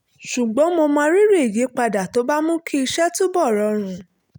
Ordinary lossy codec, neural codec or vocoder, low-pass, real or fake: none; none; none; real